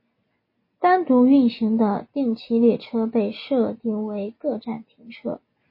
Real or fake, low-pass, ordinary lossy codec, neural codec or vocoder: real; 5.4 kHz; MP3, 24 kbps; none